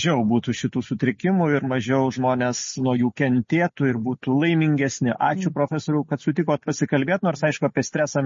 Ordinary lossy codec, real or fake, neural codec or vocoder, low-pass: MP3, 32 kbps; real; none; 7.2 kHz